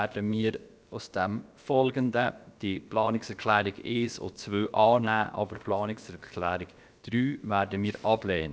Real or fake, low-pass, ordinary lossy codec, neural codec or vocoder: fake; none; none; codec, 16 kHz, about 1 kbps, DyCAST, with the encoder's durations